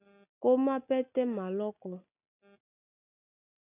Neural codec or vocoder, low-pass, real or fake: none; 3.6 kHz; real